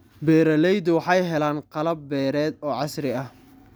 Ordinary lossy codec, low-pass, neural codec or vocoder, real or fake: none; none; none; real